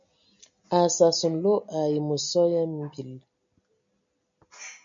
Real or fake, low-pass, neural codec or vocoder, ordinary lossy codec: real; 7.2 kHz; none; MP3, 64 kbps